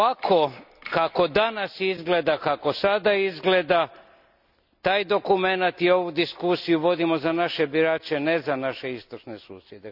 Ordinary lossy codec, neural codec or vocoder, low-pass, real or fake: none; none; 5.4 kHz; real